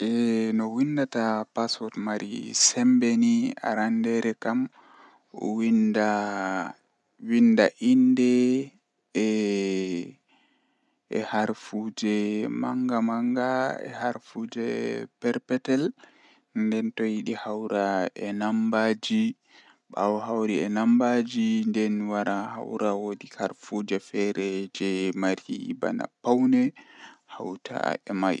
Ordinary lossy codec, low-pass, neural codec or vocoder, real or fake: none; 10.8 kHz; none; real